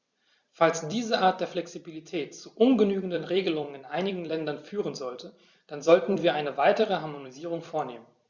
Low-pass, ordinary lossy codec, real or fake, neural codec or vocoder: 7.2 kHz; Opus, 64 kbps; fake; vocoder, 44.1 kHz, 128 mel bands every 256 samples, BigVGAN v2